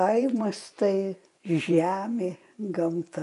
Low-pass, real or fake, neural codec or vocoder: 10.8 kHz; fake; vocoder, 24 kHz, 100 mel bands, Vocos